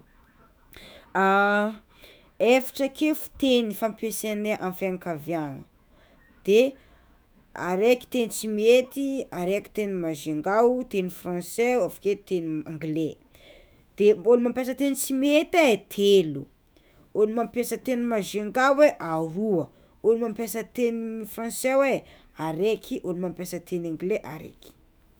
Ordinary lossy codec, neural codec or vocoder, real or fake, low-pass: none; autoencoder, 48 kHz, 128 numbers a frame, DAC-VAE, trained on Japanese speech; fake; none